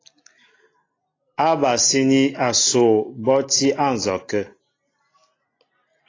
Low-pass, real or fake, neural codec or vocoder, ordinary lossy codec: 7.2 kHz; real; none; AAC, 32 kbps